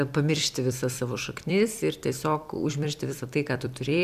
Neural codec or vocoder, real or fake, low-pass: vocoder, 44.1 kHz, 128 mel bands every 256 samples, BigVGAN v2; fake; 14.4 kHz